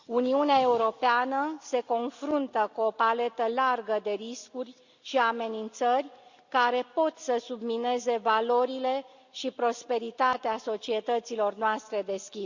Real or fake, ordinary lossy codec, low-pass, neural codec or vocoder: real; Opus, 64 kbps; 7.2 kHz; none